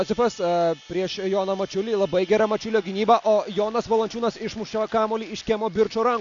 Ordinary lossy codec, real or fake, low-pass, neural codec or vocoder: AAC, 64 kbps; real; 7.2 kHz; none